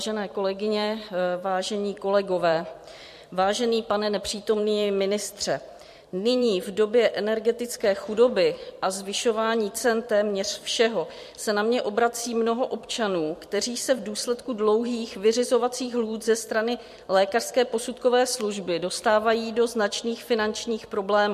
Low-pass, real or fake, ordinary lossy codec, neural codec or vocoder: 14.4 kHz; fake; MP3, 64 kbps; vocoder, 44.1 kHz, 128 mel bands every 256 samples, BigVGAN v2